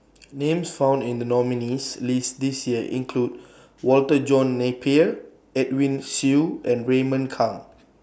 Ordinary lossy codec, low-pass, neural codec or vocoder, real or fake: none; none; none; real